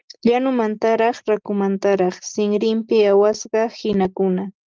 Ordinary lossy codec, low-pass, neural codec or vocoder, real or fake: Opus, 32 kbps; 7.2 kHz; none; real